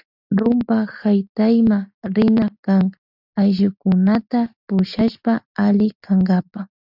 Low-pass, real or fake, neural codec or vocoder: 5.4 kHz; real; none